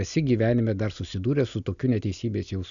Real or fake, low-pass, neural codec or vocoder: real; 7.2 kHz; none